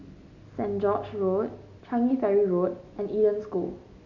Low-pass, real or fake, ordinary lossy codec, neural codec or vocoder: 7.2 kHz; real; none; none